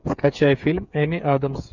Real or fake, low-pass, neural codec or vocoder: fake; 7.2 kHz; codec, 16 kHz, 8 kbps, FreqCodec, smaller model